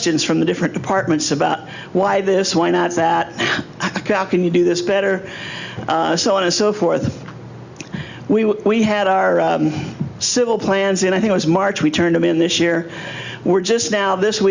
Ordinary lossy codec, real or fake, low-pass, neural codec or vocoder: Opus, 64 kbps; real; 7.2 kHz; none